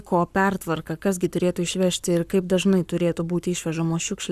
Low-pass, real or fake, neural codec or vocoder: 14.4 kHz; fake; codec, 44.1 kHz, 7.8 kbps, Pupu-Codec